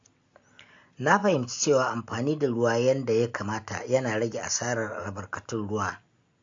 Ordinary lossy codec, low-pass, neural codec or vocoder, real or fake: AAC, 48 kbps; 7.2 kHz; none; real